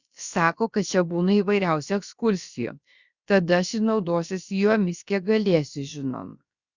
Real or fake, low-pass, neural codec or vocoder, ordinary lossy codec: fake; 7.2 kHz; codec, 16 kHz, about 1 kbps, DyCAST, with the encoder's durations; Opus, 64 kbps